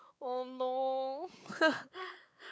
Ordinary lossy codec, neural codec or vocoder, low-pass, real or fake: none; codec, 16 kHz, 4 kbps, X-Codec, WavLM features, trained on Multilingual LibriSpeech; none; fake